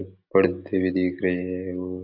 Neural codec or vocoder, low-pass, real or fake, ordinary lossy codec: none; 5.4 kHz; real; none